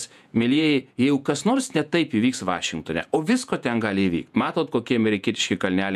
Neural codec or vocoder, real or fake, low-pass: vocoder, 48 kHz, 128 mel bands, Vocos; fake; 14.4 kHz